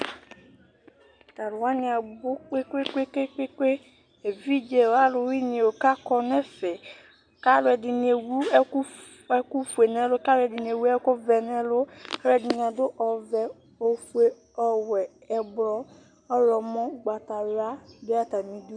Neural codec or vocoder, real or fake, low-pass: none; real; 9.9 kHz